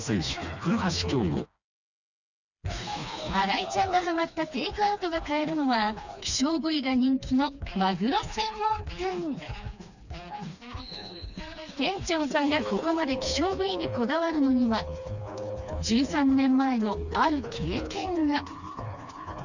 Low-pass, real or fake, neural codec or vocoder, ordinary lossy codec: 7.2 kHz; fake; codec, 16 kHz, 2 kbps, FreqCodec, smaller model; none